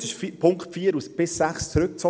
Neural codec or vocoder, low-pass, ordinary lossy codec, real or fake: none; none; none; real